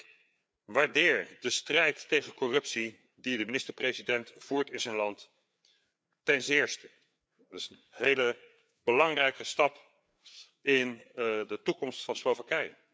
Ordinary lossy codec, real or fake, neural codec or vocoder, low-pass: none; fake; codec, 16 kHz, 4 kbps, FreqCodec, larger model; none